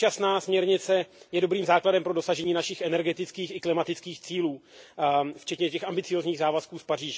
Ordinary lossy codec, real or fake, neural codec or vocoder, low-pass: none; real; none; none